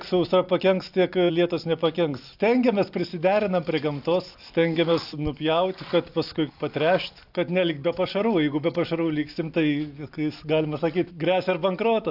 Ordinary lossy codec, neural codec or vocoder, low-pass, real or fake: AAC, 48 kbps; none; 5.4 kHz; real